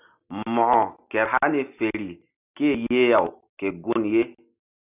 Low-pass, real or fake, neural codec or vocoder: 3.6 kHz; real; none